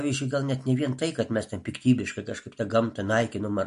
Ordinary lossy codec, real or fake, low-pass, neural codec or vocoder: MP3, 48 kbps; real; 10.8 kHz; none